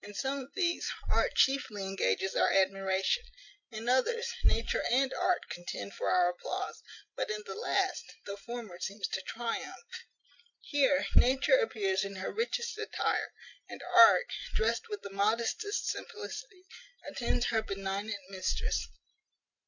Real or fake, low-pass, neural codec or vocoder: real; 7.2 kHz; none